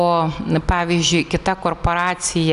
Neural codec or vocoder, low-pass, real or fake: none; 10.8 kHz; real